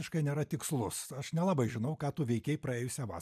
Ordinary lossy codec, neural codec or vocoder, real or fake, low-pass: MP3, 96 kbps; none; real; 14.4 kHz